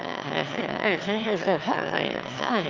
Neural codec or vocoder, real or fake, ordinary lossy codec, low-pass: autoencoder, 22.05 kHz, a latent of 192 numbers a frame, VITS, trained on one speaker; fake; Opus, 24 kbps; 7.2 kHz